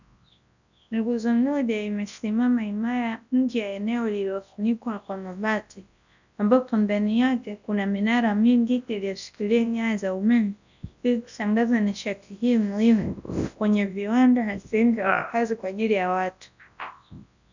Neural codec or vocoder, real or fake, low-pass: codec, 24 kHz, 0.9 kbps, WavTokenizer, large speech release; fake; 7.2 kHz